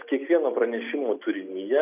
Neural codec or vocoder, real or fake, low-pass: none; real; 3.6 kHz